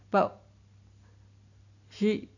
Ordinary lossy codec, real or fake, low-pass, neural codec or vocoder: none; real; 7.2 kHz; none